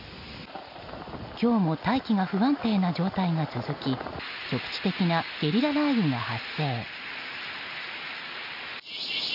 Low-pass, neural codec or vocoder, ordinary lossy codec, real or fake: 5.4 kHz; none; none; real